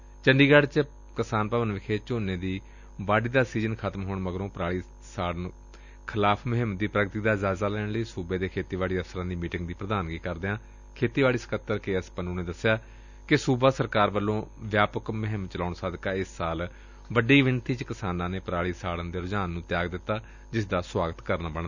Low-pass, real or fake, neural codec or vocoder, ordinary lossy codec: 7.2 kHz; real; none; none